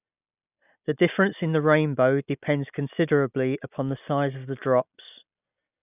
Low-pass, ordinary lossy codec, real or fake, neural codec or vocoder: 3.6 kHz; none; real; none